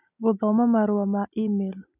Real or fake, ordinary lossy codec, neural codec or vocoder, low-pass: real; none; none; 3.6 kHz